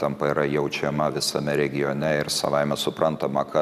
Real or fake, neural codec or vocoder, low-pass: real; none; 14.4 kHz